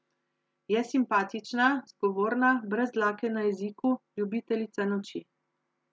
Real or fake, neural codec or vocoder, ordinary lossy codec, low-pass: real; none; none; none